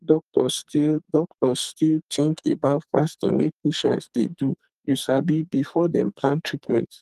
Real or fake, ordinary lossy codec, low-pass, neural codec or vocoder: fake; Opus, 32 kbps; 14.4 kHz; codec, 44.1 kHz, 2.6 kbps, SNAC